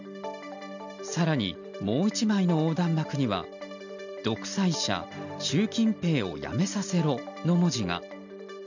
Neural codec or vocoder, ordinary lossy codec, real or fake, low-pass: none; none; real; 7.2 kHz